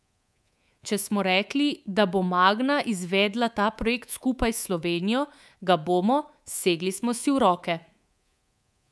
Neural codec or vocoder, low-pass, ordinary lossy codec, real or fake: codec, 24 kHz, 3.1 kbps, DualCodec; none; none; fake